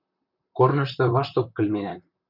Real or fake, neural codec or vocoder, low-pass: fake; vocoder, 44.1 kHz, 128 mel bands, Pupu-Vocoder; 5.4 kHz